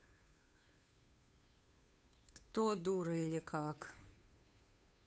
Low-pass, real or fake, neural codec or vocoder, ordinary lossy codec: none; fake; codec, 16 kHz, 2 kbps, FunCodec, trained on Chinese and English, 25 frames a second; none